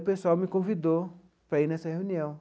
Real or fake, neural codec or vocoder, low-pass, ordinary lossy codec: real; none; none; none